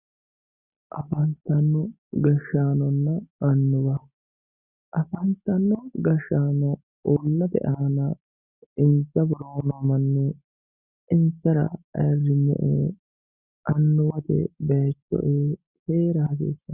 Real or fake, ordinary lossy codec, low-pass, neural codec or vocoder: real; Opus, 64 kbps; 3.6 kHz; none